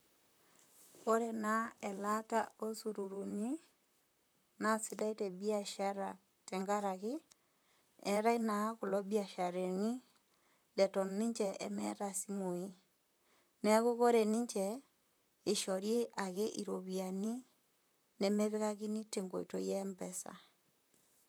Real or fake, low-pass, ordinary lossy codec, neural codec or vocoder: fake; none; none; vocoder, 44.1 kHz, 128 mel bands, Pupu-Vocoder